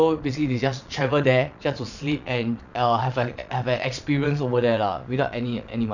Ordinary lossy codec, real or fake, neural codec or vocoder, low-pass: none; fake; vocoder, 22.05 kHz, 80 mel bands, Vocos; 7.2 kHz